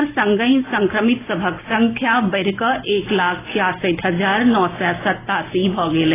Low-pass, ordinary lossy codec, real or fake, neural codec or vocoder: 3.6 kHz; AAC, 16 kbps; real; none